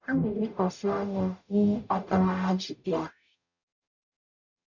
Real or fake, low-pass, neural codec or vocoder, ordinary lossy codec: fake; 7.2 kHz; codec, 44.1 kHz, 0.9 kbps, DAC; none